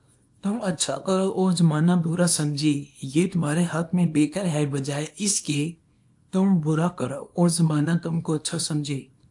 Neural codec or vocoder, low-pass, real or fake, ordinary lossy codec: codec, 24 kHz, 0.9 kbps, WavTokenizer, small release; 10.8 kHz; fake; AAC, 64 kbps